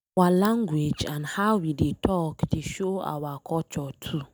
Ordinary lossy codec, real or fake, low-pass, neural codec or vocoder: none; real; none; none